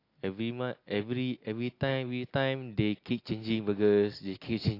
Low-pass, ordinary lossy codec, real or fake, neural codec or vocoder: 5.4 kHz; AAC, 32 kbps; real; none